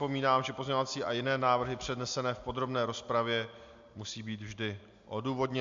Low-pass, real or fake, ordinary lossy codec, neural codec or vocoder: 7.2 kHz; real; MP3, 64 kbps; none